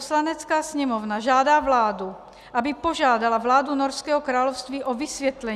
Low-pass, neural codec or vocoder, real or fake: 14.4 kHz; none; real